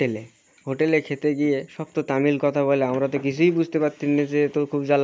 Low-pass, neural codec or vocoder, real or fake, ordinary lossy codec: none; none; real; none